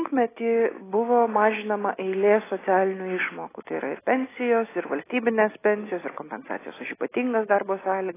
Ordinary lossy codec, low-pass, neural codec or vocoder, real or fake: AAC, 16 kbps; 3.6 kHz; none; real